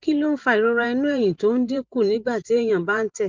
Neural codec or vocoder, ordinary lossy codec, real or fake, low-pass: vocoder, 44.1 kHz, 128 mel bands every 512 samples, BigVGAN v2; Opus, 24 kbps; fake; 7.2 kHz